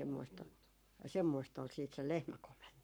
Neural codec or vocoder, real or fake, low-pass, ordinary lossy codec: codec, 44.1 kHz, 7.8 kbps, Pupu-Codec; fake; none; none